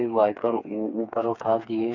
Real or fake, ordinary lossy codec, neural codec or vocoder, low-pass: fake; none; codec, 16 kHz, 4 kbps, FreqCodec, smaller model; 7.2 kHz